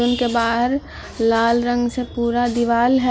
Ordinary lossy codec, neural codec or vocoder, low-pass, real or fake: none; none; none; real